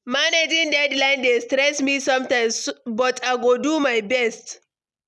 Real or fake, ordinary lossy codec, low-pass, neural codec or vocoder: real; none; none; none